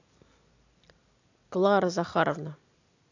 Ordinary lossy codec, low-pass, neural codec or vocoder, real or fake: AAC, 48 kbps; 7.2 kHz; none; real